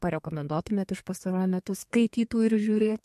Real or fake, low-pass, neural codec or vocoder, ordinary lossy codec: fake; 14.4 kHz; codec, 32 kHz, 1.9 kbps, SNAC; MP3, 64 kbps